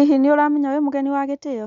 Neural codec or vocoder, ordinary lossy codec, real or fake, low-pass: none; none; real; 7.2 kHz